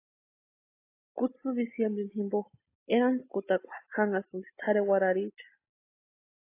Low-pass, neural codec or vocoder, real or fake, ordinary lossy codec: 3.6 kHz; none; real; AAC, 24 kbps